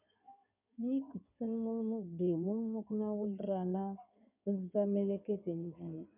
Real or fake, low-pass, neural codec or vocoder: fake; 3.6 kHz; codec, 16 kHz in and 24 kHz out, 2.2 kbps, FireRedTTS-2 codec